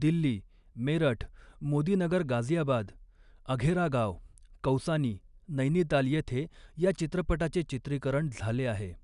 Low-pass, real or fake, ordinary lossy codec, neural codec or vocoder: 10.8 kHz; real; none; none